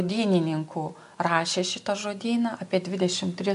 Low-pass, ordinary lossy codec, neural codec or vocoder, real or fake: 10.8 kHz; MP3, 64 kbps; none; real